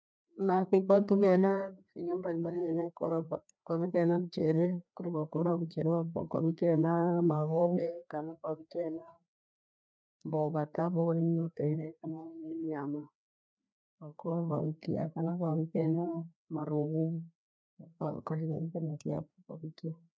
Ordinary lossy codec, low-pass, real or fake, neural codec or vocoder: none; none; fake; codec, 16 kHz, 2 kbps, FreqCodec, larger model